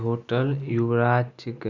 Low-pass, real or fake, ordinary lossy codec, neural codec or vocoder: 7.2 kHz; real; none; none